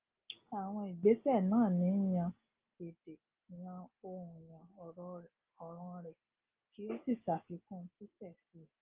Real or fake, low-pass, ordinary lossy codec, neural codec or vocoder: real; 3.6 kHz; Opus, 32 kbps; none